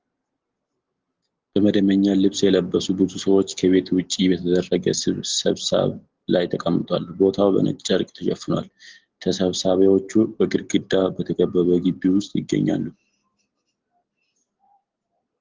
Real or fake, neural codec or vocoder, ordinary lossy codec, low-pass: real; none; Opus, 16 kbps; 7.2 kHz